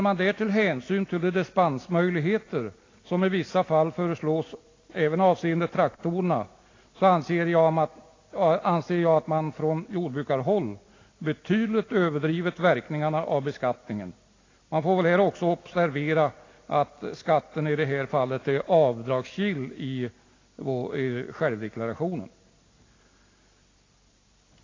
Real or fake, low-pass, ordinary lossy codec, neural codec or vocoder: real; 7.2 kHz; AAC, 32 kbps; none